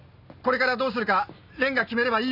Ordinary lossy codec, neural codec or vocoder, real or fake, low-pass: none; none; real; 5.4 kHz